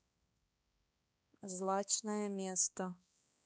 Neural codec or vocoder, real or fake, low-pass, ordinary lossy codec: codec, 16 kHz, 2 kbps, X-Codec, HuBERT features, trained on balanced general audio; fake; none; none